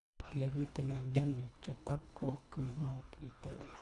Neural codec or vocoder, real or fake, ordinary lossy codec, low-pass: codec, 24 kHz, 1.5 kbps, HILCodec; fake; none; 10.8 kHz